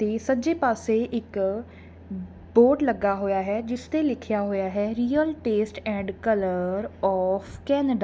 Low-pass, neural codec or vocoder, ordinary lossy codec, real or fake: none; none; none; real